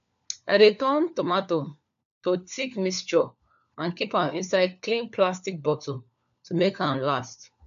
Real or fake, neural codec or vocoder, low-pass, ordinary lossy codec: fake; codec, 16 kHz, 4 kbps, FunCodec, trained on LibriTTS, 50 frames a second; 7.2 kHz; none